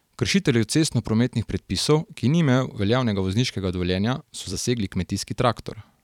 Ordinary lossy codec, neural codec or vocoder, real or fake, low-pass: none; vocoder, 44.1 kHz, 128 mel bands every 256 samples, BigVGAN v2; fake; 19.8 kHz